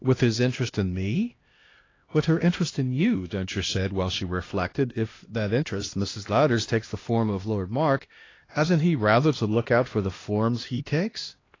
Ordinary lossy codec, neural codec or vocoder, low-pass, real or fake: AAC, 32 kbps; codec, 16 kHz, 1 kbps, X-Codec, HuBERT features, trained on LibriSpeech; 7.2 kHz; fake